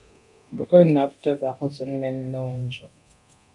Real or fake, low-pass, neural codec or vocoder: fake; 10.8 kHz; codec, 24 kHz, 0.9 kbps, DualCodec